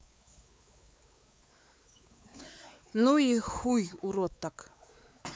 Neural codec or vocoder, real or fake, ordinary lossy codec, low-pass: codec, 16 kHz, 4 kbps, X-Codec, WavLM features, trained on Multilingual LibriSpeech; fake; none; none